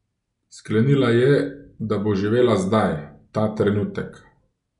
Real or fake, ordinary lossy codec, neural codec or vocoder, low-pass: real; none; none; 10.8 kHz